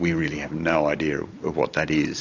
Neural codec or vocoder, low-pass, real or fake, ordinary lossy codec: none; 7.2 kHz; real; AAC, 48 kbps